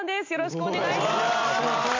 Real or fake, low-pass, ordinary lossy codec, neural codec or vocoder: real; 7.2 kHz; MP3, 64 kbps; none